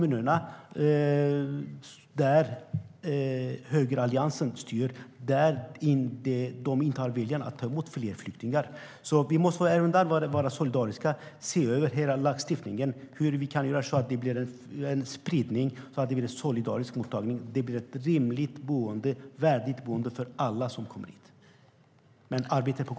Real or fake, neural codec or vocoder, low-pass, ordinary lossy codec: real; none; none; none